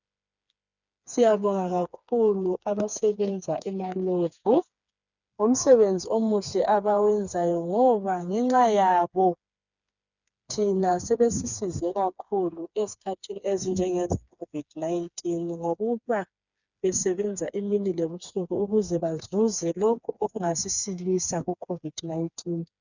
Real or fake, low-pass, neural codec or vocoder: fake; 7.2 kHz; codec, 16 kHz, 4 kbps, FreqCodec, smaller model